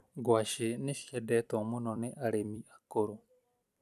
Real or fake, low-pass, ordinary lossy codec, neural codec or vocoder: fake; 14.4 kHz; none; vocoder, 44.1 kHz, 128 mel bands every 256 samples, BigVGAN v2